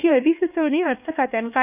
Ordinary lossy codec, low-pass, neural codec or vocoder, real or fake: none; 3.6 kHz; codec, 16 kHz, 2 kbps, X-Codec, HuBERT features, trained on LibriSpeech; fake